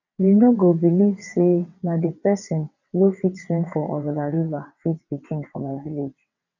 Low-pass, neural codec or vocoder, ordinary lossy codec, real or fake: 7.2 kHz; vocoder, 22.05 kHz, 80 mel bands, WaveNeXt; none; fake